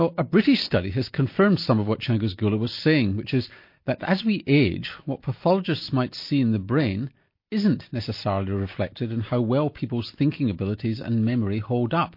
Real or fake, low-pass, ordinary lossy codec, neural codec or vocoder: real; 5.4 kHz; MP3, 32 kbps; none